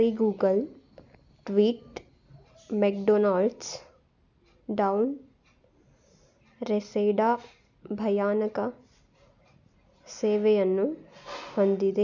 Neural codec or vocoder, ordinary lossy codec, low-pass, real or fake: none; none; 7.2 kHz; real